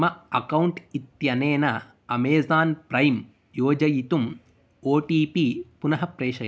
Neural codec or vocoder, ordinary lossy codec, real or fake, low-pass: none; none; real; none